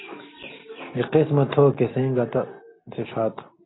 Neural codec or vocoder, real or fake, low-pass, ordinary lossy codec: none; real; 7.2 kHz; AAC, 16 kbps